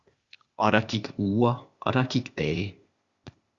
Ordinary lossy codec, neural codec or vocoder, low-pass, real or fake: Opus, 64 kbps; codec, 16 kHz, 0.8 kbps, ZipCodec; 7.2 kHz; fake